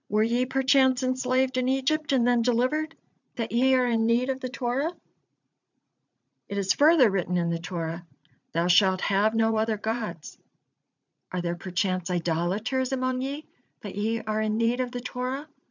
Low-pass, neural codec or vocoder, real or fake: 7.2 kHz; vocoder, 44.1 kHz, 80 mel bands, Vocos; fake